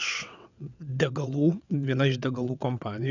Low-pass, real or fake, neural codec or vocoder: 7.2 kHz; fake; vocoder, 22.05 kHz, 80 mel bands, WaveNeXt